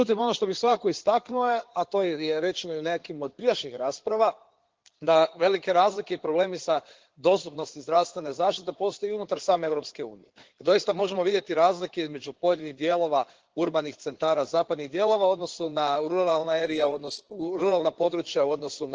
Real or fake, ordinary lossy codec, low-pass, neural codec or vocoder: fake; Opus, 16 kbps; 7.2 kHz; codec, 16 kHz in and 24 kHz out, 2.2 kbps, FireRedTTS-2 codec